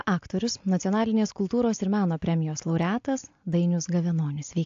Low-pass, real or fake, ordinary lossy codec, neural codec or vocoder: 7.2 kHz; real; AAC, 48 kbps; none